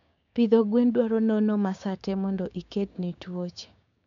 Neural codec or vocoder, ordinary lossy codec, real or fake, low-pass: codec, 16 kHz, 6 kbps, DAC; none; fake; 7.2 kHz